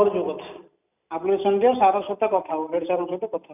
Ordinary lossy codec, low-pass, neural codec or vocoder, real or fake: none; 3.6 kHz; none; real